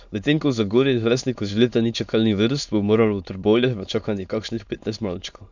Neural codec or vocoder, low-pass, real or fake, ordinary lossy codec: autoencoder, 22.05 kHz, a latent of 192 numbers a frame, VITS, trained on many speakers; 7.2 kHz; fake; none